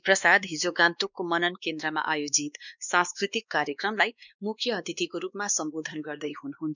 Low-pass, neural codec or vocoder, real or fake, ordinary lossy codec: 7.2 kHz; codec, 16 kHz, 2 kbps, X-Codec, WavLM features, trained on Multilingual LibriSpeech; fake; none